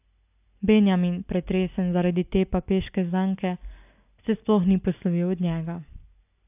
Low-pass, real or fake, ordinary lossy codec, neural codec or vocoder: 3.6 kHz; real; AAC, 32 kbps; none